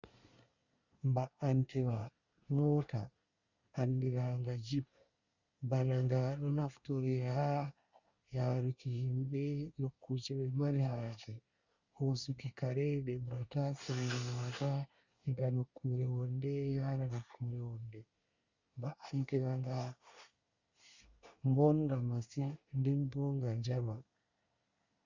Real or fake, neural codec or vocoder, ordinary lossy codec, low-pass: fake; codec, 24 kHz, 1 kbps, SNAC; Opus, 64 kbps; 7.2 kHz